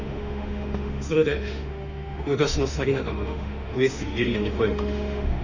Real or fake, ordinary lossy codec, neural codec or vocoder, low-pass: fake; none; autoencoder, 48 kHz, 32 numbers a frame, DAC-VAE, trained on Japanese speech; 7.2 kHz